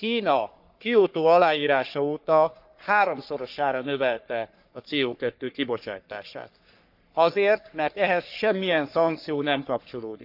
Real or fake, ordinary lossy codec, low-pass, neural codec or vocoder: fake; none; 5.4 kHz; codec, 44.1 kHz, 3.4 kbps, Pupu-Codec